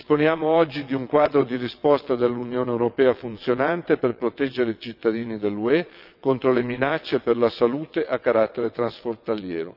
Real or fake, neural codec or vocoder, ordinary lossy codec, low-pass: fake; vocoder, 22.05 kHz, 80 mel bands, WaveNeXt; none; 5.4 kHz